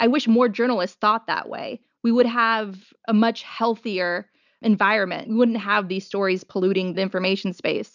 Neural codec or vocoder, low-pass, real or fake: none; 7.2 kHz; real